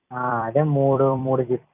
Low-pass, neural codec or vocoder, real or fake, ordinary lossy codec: 3.6 kHz; vocoder, 44.1 kHz, 128 mel bands every 256 samples, BigVGAN v2; fake; none